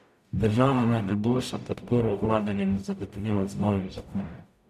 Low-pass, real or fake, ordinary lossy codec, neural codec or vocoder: 14.4 kHz; fake; none; codec, 44.1 kHz, 0.9 kbps, DAC